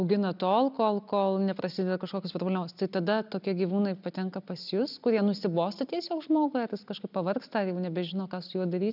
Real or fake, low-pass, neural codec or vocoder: real; 5.4 kHz; none